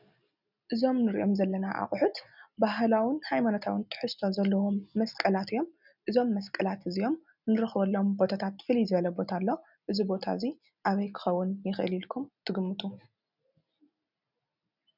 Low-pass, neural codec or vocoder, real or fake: 5.4 kHz; none; real